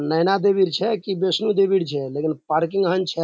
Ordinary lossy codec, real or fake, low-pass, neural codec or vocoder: none; real; none; none